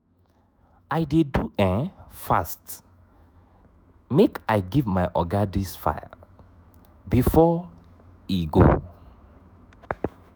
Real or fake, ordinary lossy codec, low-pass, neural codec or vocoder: fake; none; none; autoencoder, 48 kHz, 128 numbers a frame, DAC-VAE, trained on Japanese speech